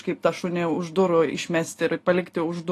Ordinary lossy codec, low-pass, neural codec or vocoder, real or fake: AAC, 48 kbps; 14.4 kHz; none; real